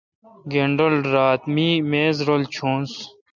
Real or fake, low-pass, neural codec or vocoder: real; 7.2 kHz; none